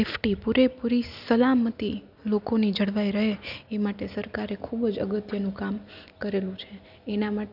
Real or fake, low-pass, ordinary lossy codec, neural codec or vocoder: real; 5.4 kHz; none; none